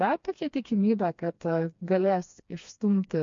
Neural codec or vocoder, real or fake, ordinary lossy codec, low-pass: codec, 16 kHz, 2 kbps, FreqCodec, smaller model; fake; MP3, 48 kbps; 7.2 kHz